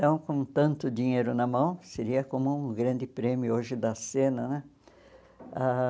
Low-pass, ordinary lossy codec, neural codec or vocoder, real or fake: none; none; none; real